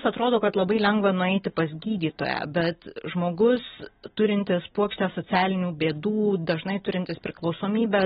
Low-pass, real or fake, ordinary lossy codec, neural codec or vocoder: 10.8 kHz; real; AAC, 16 kbps; none